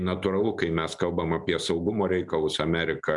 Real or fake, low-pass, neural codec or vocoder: real; 10.8 kHz; none